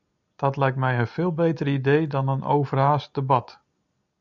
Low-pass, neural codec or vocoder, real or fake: 7.2 kHz; none; real